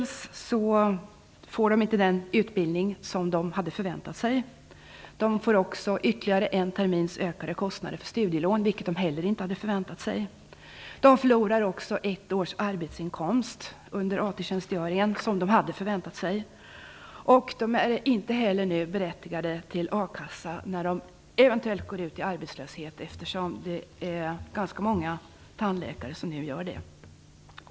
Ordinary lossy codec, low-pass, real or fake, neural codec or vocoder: none; none; real; none